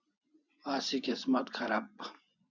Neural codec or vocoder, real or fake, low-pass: vocoder, 24 kHz, 100 mel bands, Vocos; fake; 7.2 kHz